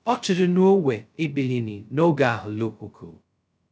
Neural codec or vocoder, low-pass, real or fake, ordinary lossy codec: codec, 16 kHz, 0.2 kbps, FocalCodec; none; fake; none